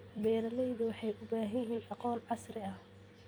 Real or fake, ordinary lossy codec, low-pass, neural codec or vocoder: fake; none; none; vocoder, 44.1 kHz, 128 mel bands every 256 samples, BigVGAN v2